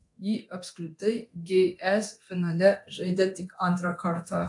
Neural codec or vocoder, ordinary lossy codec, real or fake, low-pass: codec, 24 kHz, 0.9 kbps, DualCodec; AAC, 64 kbps; fake; 10.8 kHz